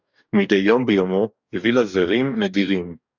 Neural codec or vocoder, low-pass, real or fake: codec, 44.1 kHz, 2.6 kbps, DAC; 7.2 kHz; fake